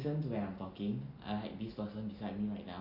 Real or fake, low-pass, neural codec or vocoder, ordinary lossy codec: real; 5.4 kHz; none; none